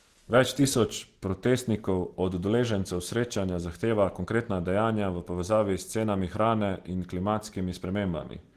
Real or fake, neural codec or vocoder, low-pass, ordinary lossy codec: real; none; 14.4 kHz; Opus, 16 kbps